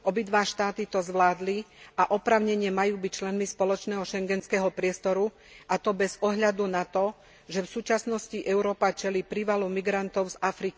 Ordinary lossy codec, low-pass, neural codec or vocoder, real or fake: none; none; none; real